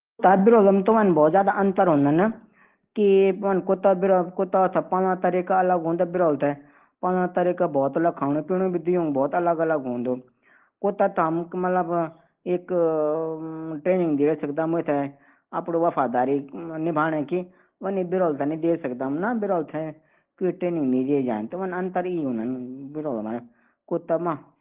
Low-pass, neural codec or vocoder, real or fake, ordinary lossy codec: 3.6 kHz; none; real; Opus, 16 kbps